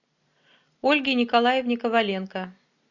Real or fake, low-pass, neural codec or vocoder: real; 7.2 kHz; none